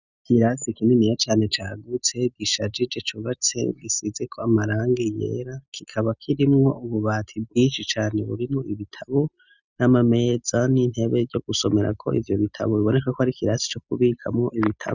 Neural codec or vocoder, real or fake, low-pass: none; real; 7.2 kHz